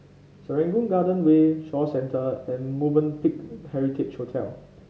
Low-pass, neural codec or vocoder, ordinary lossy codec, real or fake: none; none; none; real